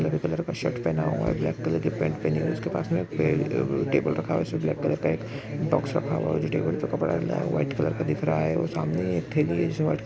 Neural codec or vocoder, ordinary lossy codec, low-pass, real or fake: none; none; none; real